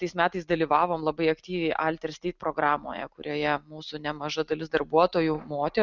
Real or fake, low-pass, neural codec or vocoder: real; 7.2 kHz; none